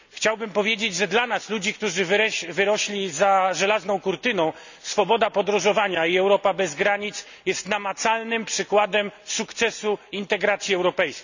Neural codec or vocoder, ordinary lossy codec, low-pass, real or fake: none; none; 7.2 kHz; real